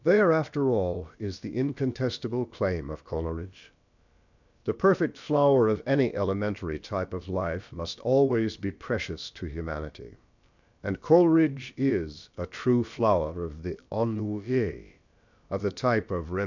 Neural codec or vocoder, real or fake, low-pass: codec, 16 kHz, about 1 kbps, DyCAST, with the encoder's durations; fake; 7.2 kHz